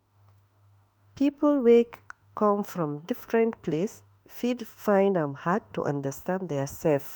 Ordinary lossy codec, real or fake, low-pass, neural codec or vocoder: none; fake; none; autoencoder, 48 kHz, 32 numbers a frame, DAC-VAE, trained on Japanese speech